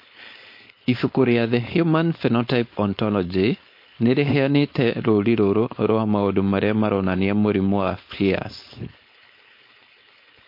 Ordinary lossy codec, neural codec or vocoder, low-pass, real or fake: MP3, 32 kbps; codec, 16 kHz, 4.8 kbps, FACodec; 5.4 kHz; fake